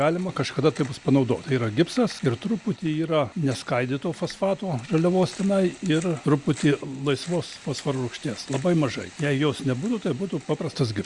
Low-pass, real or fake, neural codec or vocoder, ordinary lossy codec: 10.8 kHz; real; none; Opus, 64 kbps